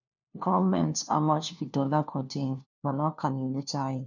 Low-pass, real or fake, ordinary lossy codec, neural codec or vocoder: 7.2 kHz; fake; none; codec, 16 kHz, 1 kbps, FunCodec, trained on LibriTTS, 50 frames a second